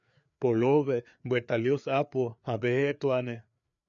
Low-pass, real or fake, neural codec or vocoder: 7.2 kHz; fake; codec, 16 kHz, 4 kbps, FreqCodec, larger model